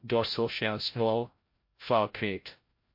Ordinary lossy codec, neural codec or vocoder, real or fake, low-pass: MP3, 32 kbps; codec, 16 kHz, 0.5 kbps, FreqCodec, larger model; fake; 5.4 kHz